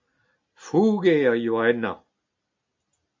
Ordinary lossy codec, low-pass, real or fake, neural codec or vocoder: MP3, 64 kbps; 7.2 kHz; real; none